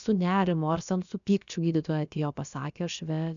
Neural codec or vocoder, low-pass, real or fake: codec, 16 kHz, about 1 kbps, DyCAST, with the encoder's durations; 7.2 kHz; fake